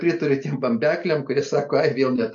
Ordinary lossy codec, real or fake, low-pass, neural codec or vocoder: MP3, 48 kbps; real; 7.2 kHz; none